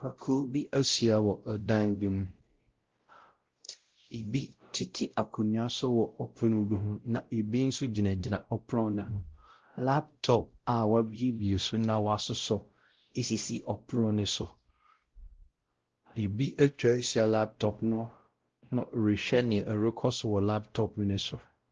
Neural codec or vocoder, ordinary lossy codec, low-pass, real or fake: codec, 16 kHz, 0.5 kbps, X-Codec, WavLM features, trained on Multilingual LibriSpeech; Opus, 16 kbps; 7.2 kHz; fake